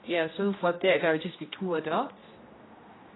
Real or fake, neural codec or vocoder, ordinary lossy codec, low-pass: fake; codec, 16 kHz, 1 kbps, X-Codec, HuBERT features, trained on general audio; AAC, 16 kbps; 7.2 kHz